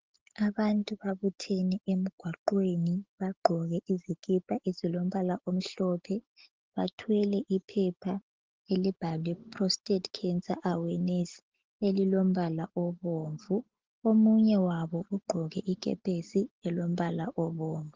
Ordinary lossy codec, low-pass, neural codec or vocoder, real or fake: Opus, 16 kbps; 7.2 kHz; none; real